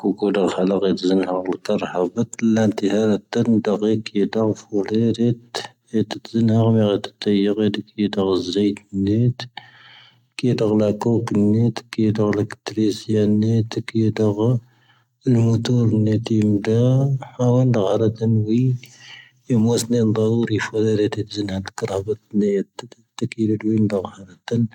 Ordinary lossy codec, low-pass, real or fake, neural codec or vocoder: none; 19.8 kHz; real; none